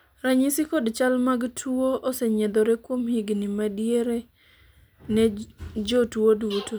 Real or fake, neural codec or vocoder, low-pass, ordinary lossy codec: real; none; none; none